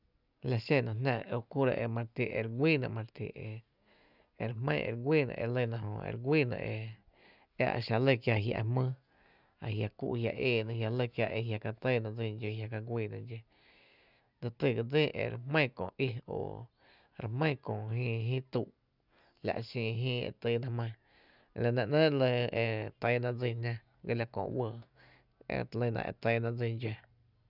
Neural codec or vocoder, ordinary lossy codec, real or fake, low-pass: none; none; real; 5.4 kHz